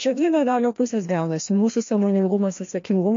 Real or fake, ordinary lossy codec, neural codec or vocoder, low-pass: fake; MP3, 48 kbps; codec, 16 kHz, 1 kbps, FreqCodec, larger model; 7.2 kHz